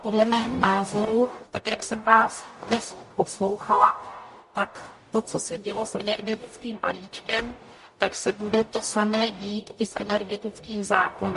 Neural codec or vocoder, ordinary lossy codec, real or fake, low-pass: codec, 44.1 kHz, 0.9 kbps, DAC; MP3, 48 kbps; fake; 14.4 kHz